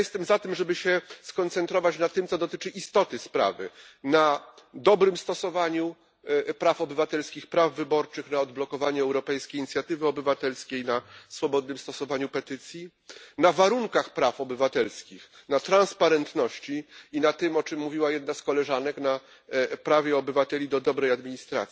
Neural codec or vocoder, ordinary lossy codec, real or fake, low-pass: none; none; real; none